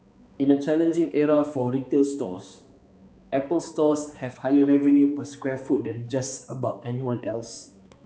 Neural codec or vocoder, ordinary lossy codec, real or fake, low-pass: codec, 16 kHz, 2 kbps, X-Codec, HuBERT features, trained on balanced general audio; none; fake; none